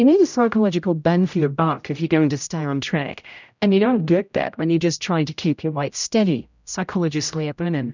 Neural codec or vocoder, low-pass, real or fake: codec, 16 kHz, 0.5 kbps, X-Codec, HuBERT features, trained on general audio; 7.2 kHz; fake